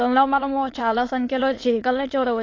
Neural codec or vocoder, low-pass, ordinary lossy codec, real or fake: autoencoder, 22.05 kHz, a latent of 192 numbers a frame, VITS, trained on many speakers; 7.2 kHz; AAC, 32 kbps; fake